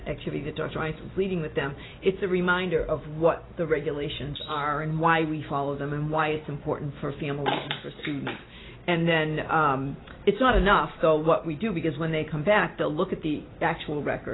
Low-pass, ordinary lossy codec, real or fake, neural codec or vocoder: 7.2 kHz; AAC, 16 kbps; real; none